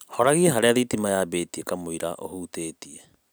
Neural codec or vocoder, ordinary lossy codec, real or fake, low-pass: none; none; real; none